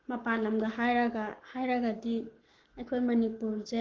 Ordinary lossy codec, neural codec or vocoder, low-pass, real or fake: Opus, 16 kbps; codec, 44.1 kHz, 7.8 kbps, Pupu-Codec; 7.2 kHz; fake